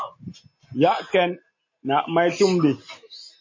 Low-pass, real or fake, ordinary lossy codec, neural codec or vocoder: 7.2 kHz; real; MP3, 32 kbps; none